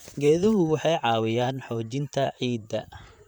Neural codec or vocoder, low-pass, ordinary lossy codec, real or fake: vocoder, 44.1 kHz, 128 mel bands, Pupu-Vocoder; none; none; fake